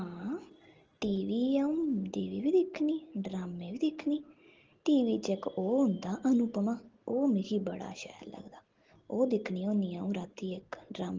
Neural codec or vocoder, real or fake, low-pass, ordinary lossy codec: none; real; 7.2 kHz; Opus, 16 kbps